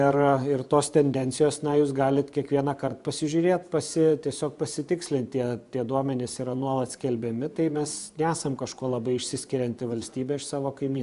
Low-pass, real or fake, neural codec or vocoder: 10.8 kHz; fake; vocoder, 24 kHz, 100 mel bands, Vocos